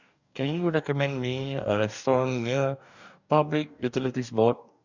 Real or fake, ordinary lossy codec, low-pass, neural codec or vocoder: fake; none; 7.2 kHz; codec, 44.1 kHz, 2.6 kbps, DAC